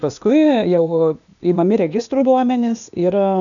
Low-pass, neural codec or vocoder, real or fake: 7.2 kHz; codec, 16 kHz, 0.8 kbps, ZipCodec; fake